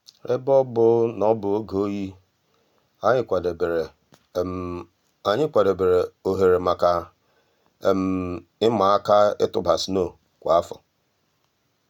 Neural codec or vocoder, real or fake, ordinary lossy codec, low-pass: none; real; none; 19.8 kHz